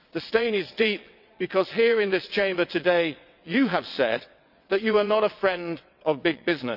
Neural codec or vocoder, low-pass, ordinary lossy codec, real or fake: vocoder, 22.05 kHz, 80 mel bands, WaveNeXt; 5.4 kHz; none; fake